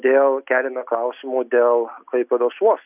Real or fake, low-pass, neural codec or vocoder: real; 3.6 kHz; none